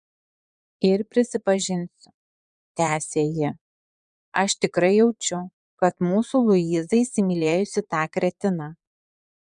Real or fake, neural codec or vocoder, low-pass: fake; vocoder, 22.05 kHz, 80 mel bands, Vocos; 9.9 kHz